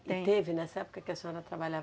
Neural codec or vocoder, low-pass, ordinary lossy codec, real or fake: none; none; none; real